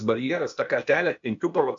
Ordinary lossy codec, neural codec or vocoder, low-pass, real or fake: AAC, 64 kbps; codec, 16 kHz, 0.8 kbps, ZipCodec; 7.2 kHz; fake